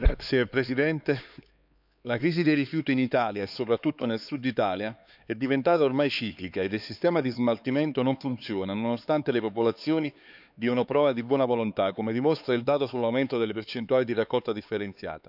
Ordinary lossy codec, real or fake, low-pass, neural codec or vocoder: none; fake; 5.4 kHz; codec, 16 kHz, 4 kbps, X-Codec, HuBERT features, trained on LibriSpeech